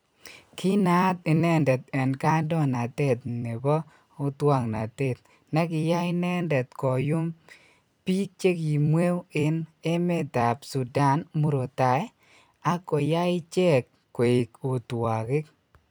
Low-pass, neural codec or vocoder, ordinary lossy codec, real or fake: none; vocoder, 44.1 kHz, 128 mel bands every 256 samples, BigVGAN v2; none; fake